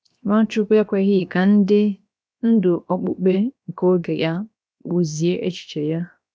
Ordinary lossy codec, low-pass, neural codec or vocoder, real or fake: none; none; codec, 16 kHz, 0.7 kbps, FocalCodec; fake